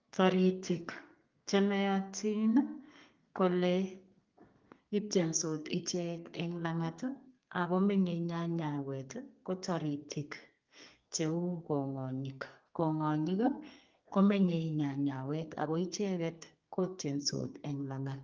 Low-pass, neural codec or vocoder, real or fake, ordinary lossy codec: 7.2 kHz; codec, 32 kHz, 1.9 kbps, SNAC; fake; Opus, 32 kbps